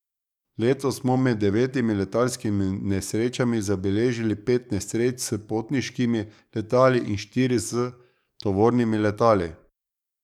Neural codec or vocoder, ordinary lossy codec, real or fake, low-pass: codec, 44.1 kHz, 7.8 kbps, DAC; none; fake; 19.8 kHz